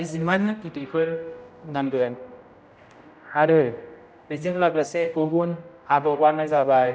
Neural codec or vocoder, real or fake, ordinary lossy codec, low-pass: codec, 16 kHz, 0.5 kbps, X-Codec, HuBERT features, trained on general audio; fake; none; none